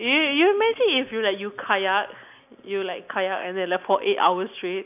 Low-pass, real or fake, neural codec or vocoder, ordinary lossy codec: 3.6 kHz; real; none; none